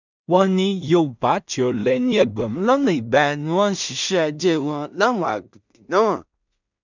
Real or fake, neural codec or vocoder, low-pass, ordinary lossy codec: fake; codec, 16 kHz in and 24 kHz out, 0.4 kbps, LongCat-Audio-Codec, two codebook decoder; 7.2 kHz; none